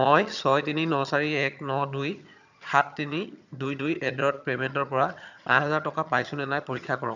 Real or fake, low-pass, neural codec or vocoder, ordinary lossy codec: fake; 7.2 kHz; vocoder, 22.05 kHz, 80 mel bands, HiFi-GAN; none